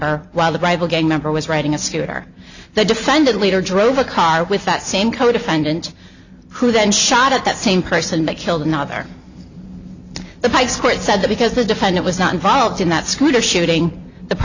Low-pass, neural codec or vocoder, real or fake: 7.2 kHz; none; real